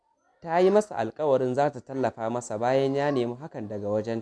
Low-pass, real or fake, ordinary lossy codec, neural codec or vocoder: none; real; none; none